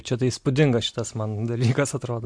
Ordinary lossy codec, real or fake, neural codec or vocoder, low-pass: MP3, 64 kbps; real; none; 9.9 kHz